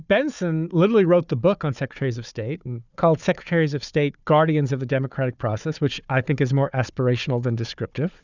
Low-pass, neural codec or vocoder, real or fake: 7.2 kHz; codec, 16 kHz, 4 kbps, FunCodec, trained on Chinese and English, 50 frames a second; fake